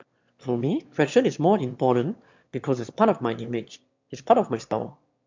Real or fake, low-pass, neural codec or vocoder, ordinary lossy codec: fake; 7.2 kHz; autoencoder, 22.05 kHz, a latent of 192 numbers a frame, VITS, trained on one speaker; MP3, 64 kbps